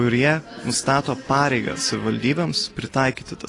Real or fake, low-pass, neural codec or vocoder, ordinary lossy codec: real; 10.8 kHz; none; AAC, 32 kbps